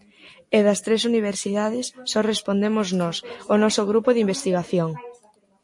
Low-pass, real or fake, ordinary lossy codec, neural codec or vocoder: 10.8 kHz; real; MP3, 48 kbps; none